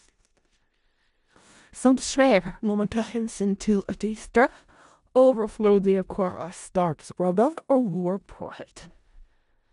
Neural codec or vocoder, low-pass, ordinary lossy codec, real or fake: codec, 16 kHz in and 24 kHz out, 0.4 kbps, LongCat-Audio-Codec, four codebook decoder; 10.8 kHz; none; fake